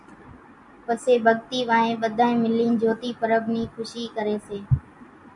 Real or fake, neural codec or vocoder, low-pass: real; none; 10.8 kHz